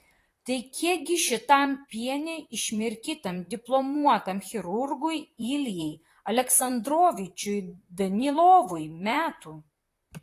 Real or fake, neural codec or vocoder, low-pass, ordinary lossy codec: fake; vocoder, 44.1 kHz, 128 mel bands, Pupu-Vocoder; 14.4 kHz; AAC, 48 kbps